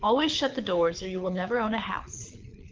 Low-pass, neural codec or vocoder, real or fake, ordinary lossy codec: 7.2 kHz; codec, 24 kHz, 6 kbps, HILCodec; fake; Opus, 32 kbps